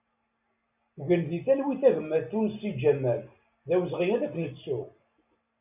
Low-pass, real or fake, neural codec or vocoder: 3.6 kHz; real; none